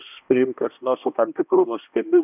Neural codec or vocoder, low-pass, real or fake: codec, 16 kHz, 1 kbps, X-Codec, HuBERT features, trained on balanced general audio; 3.6 kHz; fake